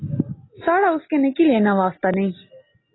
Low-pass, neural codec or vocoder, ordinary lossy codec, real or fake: 7.2 kHz; none; AAC, 16 kbps; real